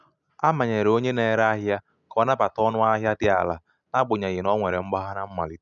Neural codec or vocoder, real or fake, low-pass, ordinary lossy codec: none; real; 7.2 kHz; none